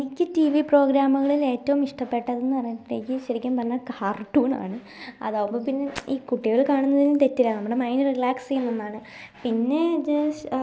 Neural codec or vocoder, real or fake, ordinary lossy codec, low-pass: none; real; none; none